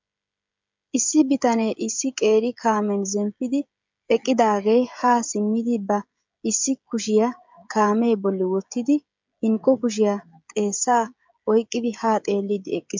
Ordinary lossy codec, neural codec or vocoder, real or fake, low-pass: MP3, 64 kbps; codec, 16 kHz, 16 kbps, FreqCodec, smaller model; fake; 7.2 kHz